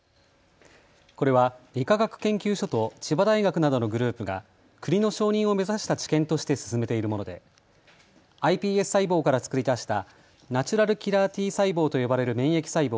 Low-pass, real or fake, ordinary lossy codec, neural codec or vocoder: none; real; none; none